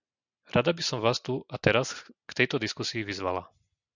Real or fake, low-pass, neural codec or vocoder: real; 7.2 kHz; none